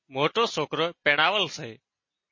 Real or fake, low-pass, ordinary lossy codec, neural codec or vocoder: real; 7.2 kHz; MP3, 32 kbps; none